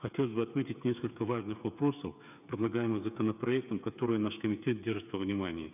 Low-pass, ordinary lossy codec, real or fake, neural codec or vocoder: 3.6 kHz; none; fake; codec, 16 kHz, 8 kbps, FreqCodec, smaller model